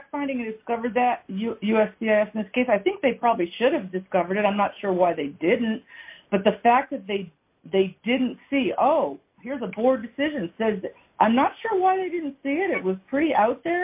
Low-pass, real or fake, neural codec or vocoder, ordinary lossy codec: 3.6 kHz; real; none; AAC, 32 kbps